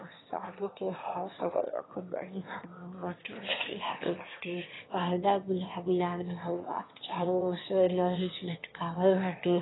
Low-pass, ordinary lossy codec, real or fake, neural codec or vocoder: 7.2 kHz; AAC, 16 kbps; fake; autoencoder, 22.05 kHz, a latent of 192 numbers a frame, VITS, trained on one speaker